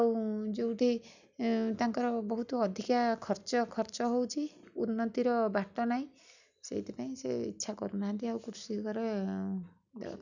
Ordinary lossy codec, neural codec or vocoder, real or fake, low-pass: none; none; real; 7.2 kHz